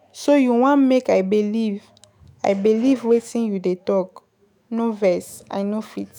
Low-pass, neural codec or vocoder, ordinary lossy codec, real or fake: none; autoencoder, 48 kHz, 128 numbers a frame, DAC-VAE, trained on Japanese speech; none; fake